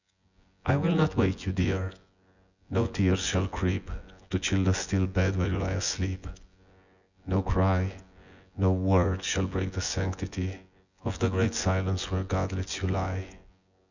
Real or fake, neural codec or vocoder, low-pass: fake; vocoder, 24 kHz, 100 mel bands, Vocos; 7.2 kHz